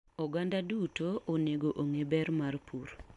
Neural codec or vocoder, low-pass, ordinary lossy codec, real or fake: none; 10.8 kHz; none; real